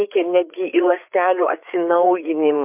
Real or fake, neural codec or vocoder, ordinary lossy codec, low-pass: fake; codec, 16 kHz, 4 kbps, FreqCodec, larger model; MP3, 32 kbps; 3.6 kHz